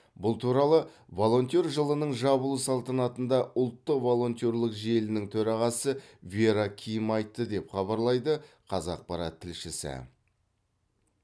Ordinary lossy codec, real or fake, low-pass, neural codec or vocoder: none; real; none; none